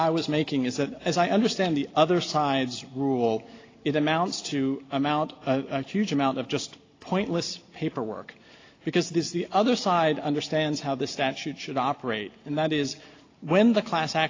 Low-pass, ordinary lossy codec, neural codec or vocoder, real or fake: 7.2 kHz; AAC, 32 kbps; none; real